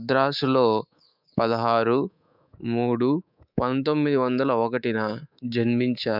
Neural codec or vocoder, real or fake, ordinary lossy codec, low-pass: codec, 24 kHz, 3.1 kbps, DualCodec; fake; none; 5.4 kHz